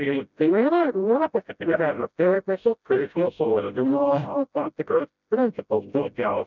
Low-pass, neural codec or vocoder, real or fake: 7.2 kHz; codec, 16 kHz, 0.5 kbps, FreqCodec, smaller model; fake